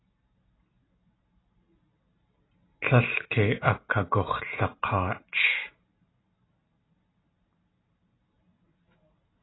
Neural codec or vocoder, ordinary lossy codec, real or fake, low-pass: none; AAC, 16 kbps; real; 7.2 kHz